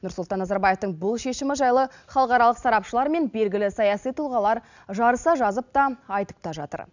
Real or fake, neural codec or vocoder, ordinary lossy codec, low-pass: real; none; none; 7.2 kHz